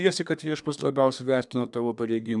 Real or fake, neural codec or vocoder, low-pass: fake; codec, 24 kHz, 1 kbps, SNAC; 10.8 kHz